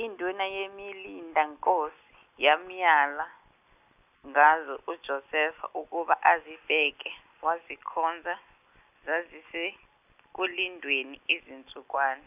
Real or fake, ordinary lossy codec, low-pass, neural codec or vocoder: real; none; 3.6 kHz; none